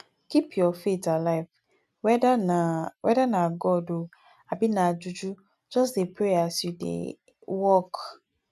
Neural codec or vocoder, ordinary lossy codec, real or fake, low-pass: none; none; real; 14.4 kHz